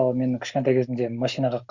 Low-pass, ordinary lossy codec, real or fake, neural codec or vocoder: 7.2 kHz; Opus, 64 kbps; real; none